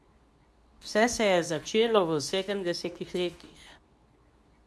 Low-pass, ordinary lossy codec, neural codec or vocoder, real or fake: none; none; codec, 24 kHz, 0.9 kbps, WavTokenizer, medium speech release version 2; fake